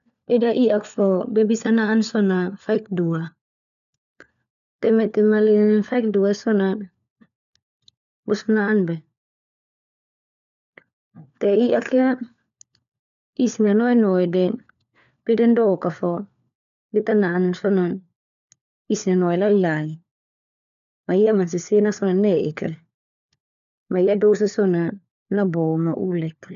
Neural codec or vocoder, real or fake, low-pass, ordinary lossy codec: codec, 16 kHz, 4 kbps, FunCodec, trained on LibriTTS, 50 frames a second; fake; 7.2 kHz; none